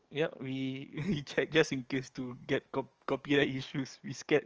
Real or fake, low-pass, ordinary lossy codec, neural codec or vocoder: fake; 7.2 kHz; Opus, 24 kbps; codec, 44.1 kHz, 7.8 kbps, DAC